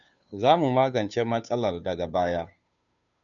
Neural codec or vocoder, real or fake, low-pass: codec, 16 kHz, 2 kbps, FunCodec, trained on Chinese and English, 25 frames a second; fake; 7.2 kHz